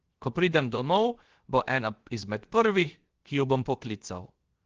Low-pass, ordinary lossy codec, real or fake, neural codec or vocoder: 7.2 kHz; Opus, 16 kbps; fake; codec, 16 kHz, 0.7 kbps, FocalCodec